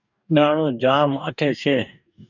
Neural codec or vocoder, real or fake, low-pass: codec, 44.1 kHz, 2.6 kbps, DAC; fake; 7.2 kHz